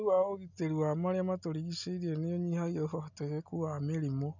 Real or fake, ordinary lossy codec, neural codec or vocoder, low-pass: real; none; none; 7.2 kHz